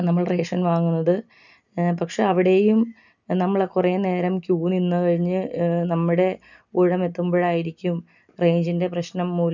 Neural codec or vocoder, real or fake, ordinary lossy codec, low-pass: none; real; none; 7.2 kHz